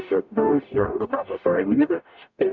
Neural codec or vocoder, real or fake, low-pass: codec, 44.1 kHz, 0.9 kbps, DAC; fake; 7.2 kHz